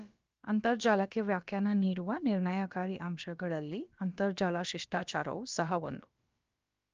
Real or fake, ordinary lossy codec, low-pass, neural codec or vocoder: fake; Opus, 24 kbps; 7.2 kHz; codec, 16 kHz, about 1 kbps, DyCAST, with the encoder's durations